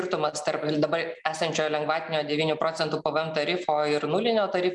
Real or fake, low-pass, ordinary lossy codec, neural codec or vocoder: real; 10.8 kHz; MP3, 96 kbps; none